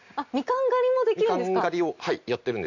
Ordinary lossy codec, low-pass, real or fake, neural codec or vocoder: none; 7.2 kHz; real; none